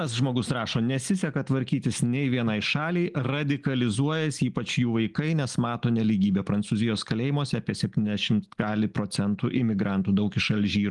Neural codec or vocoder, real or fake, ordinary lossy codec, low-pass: none; real; Opus, 24 kbps; 10.8 kHz